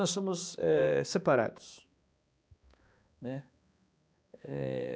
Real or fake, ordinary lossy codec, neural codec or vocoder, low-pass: fake; none; codec, 16 kHz, 2 kbps, X-Codec, HuBERT features, trained on balanced general audio; none